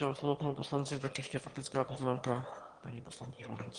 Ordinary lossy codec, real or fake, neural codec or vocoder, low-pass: Opus, 24 kbps; fake; autoencoder, 22.05 kHz, a latent of 192 numbers a frame, VITS, trained on one speaker; 9.9 kHz